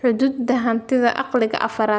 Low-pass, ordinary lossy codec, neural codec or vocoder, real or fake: none; none; none; real